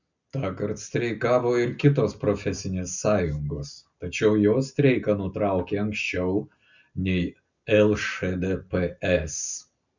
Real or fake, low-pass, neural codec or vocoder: real; 7.2 kHz; none